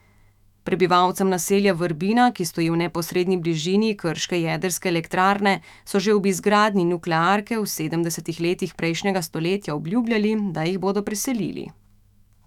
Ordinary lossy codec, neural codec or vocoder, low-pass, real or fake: none; autoencoder, 48 kHz, 128 numbers a frame, DAC-VAE, trained on Japanese speech; 19.8 kHz; fake